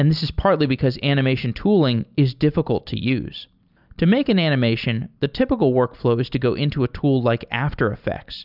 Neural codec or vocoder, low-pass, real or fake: none; 5.4 kHz; real